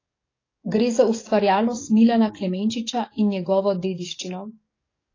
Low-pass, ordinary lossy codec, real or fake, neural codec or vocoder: 7.2 kHz; AAC, 32 kbps; fake; codec, 44.1 kHz, 7.8 kbps, DAC